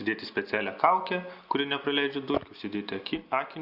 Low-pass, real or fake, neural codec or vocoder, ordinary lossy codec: 5.4 kHz; real; none; Opus, 64 kbps